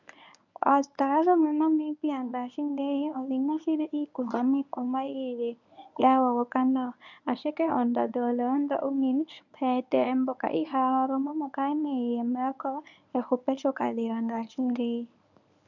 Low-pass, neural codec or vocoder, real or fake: 7.2 kHz; codec, 24 kHz, 0.9 kbps, WavTokenizer, medium speech release version 1; fake